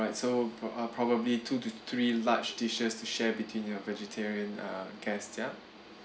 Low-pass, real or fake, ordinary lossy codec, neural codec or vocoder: none; real; none; none